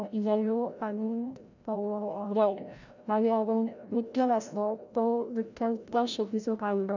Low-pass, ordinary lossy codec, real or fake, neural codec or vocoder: 7.2 kHz; none; fake; codec, 16 kHz, 0.5 kbps, FreqCodec, larger model